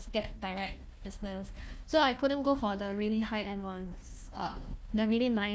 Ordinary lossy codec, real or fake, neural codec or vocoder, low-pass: none; fake; codec, 16 kHz, 1 kbps, FunCodec, trained on Chinese and English, 50 frames a second; none